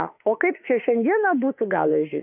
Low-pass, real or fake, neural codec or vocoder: 3.6 kHz; fake; autoencoder, 48 kHz, 32 numbers a frame, DAC-VAE, trained on Japanese speech